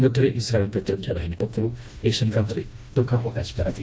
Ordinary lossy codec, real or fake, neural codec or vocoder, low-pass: none; fake; codec, 16 kHz, 1 kbps, FreqCodec, smaller model; none